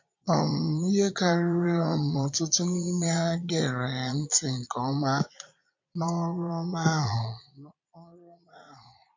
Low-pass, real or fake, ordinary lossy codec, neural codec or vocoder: 7.2 kHz; fake; MP3, 48 kbps; vocoder, 22.05 kHz, 80 mel bands, Vocos